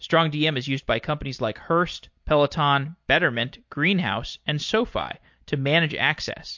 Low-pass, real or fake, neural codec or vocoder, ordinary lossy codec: 7.2 kHz; real; none; MP3, 48 kbps